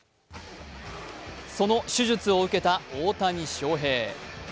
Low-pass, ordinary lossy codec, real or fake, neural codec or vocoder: none; none; real; none